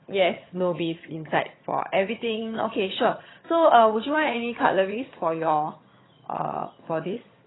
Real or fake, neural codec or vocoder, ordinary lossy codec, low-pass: fake; vocoder, 22.05 kHz, 80 mel bands, HiFi-GAN; AAC, 16 kbps; 7.2 kHz